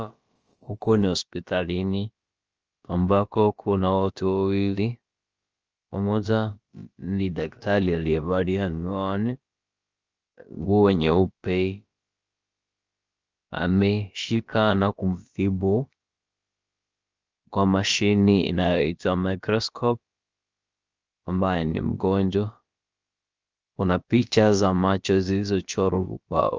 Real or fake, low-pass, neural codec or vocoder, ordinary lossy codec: fake; 7.2 kHz; codec, 16 kHz, about 1 kbps, DyCAST, with the encoder's durations; Opus, 24 kbps